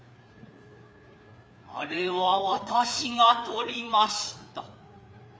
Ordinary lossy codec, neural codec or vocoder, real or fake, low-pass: none; codec, 16 kHz, 4 kbps, FreqCodec, larger model; fake; none